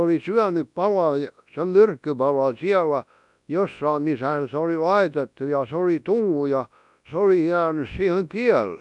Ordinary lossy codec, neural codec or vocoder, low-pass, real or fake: none; codec, 24 kHz, 0.9 kbps, WavTokenizer, large speech release; 10.8 kHz; fake